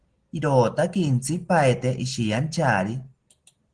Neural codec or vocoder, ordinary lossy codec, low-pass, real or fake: none; Opus, 16 kbps; 9.9 kHz; real